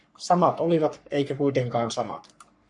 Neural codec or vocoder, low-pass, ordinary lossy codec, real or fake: codec, 44.1 kHz, 3.4 kbps, Pupu-Codec; 10.8 kHz; MP3, 64 kbps; fake